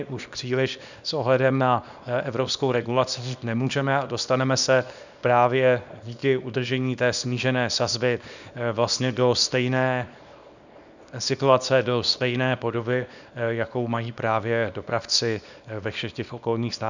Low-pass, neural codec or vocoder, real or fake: 7.2 kHz; codec, 24 kHz, 0.9 kbps, WavTokenizer, small release; fake